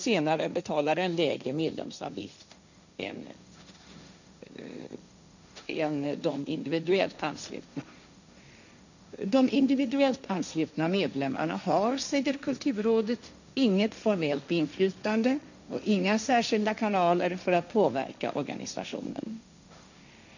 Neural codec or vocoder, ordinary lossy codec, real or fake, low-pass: codec, 16 kHz, 1.1 kbps, Voila-Tokenizer; none; fake; 7.2 kHz